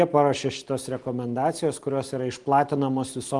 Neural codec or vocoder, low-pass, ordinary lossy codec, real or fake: none; 10.8 kHz; Opus, 24 kbps; real